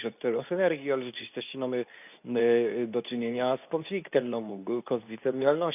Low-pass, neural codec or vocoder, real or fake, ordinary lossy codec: 3.6 kHz; codec, 24 kHz, 0.9 kbps, WavTokenizer, medium speech release version 2; fake; none